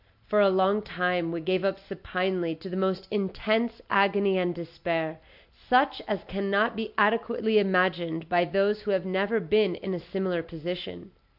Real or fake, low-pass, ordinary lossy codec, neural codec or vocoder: real; 5.4 kHz; AAC, 48 kbps; none